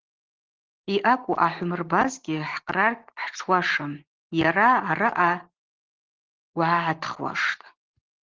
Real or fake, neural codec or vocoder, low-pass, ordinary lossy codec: real; none; 7.2 kHz; Opus, 16 kbps